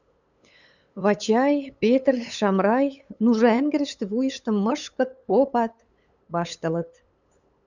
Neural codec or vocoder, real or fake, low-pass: codec, 16 kHz, 8 kbps, FunCodec, trained on LibriTTS, 25 frames a second; fake; 7.2 kHz